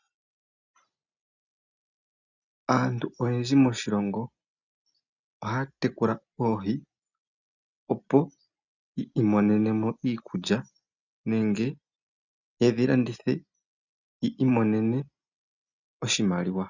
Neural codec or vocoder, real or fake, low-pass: none; real; 7.2 kHz